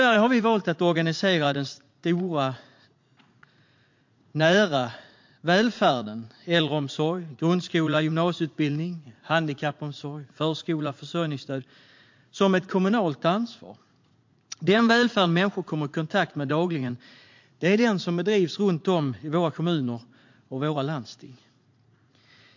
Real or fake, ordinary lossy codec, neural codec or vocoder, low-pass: fake; MP3, 48 kbps; vocoder, 44.1 kHz, 80 mel bands, Vocos; 7.2 kHz